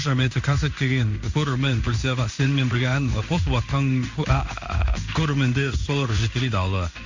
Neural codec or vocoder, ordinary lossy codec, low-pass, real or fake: codec, 16 kHz in and 24 kHz out, 1 kbps, XY-Tokenizer; Opus, 64 kbps; 7.2 kHz; fake